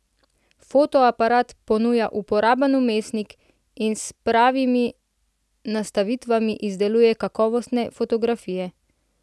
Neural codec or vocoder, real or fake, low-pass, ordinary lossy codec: none; real; none; none